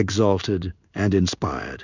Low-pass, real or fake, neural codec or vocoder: 7.2 kHz; fake; codec, 16 kHz in and 24 kHz out, 1 kbps, XY-Tokenizer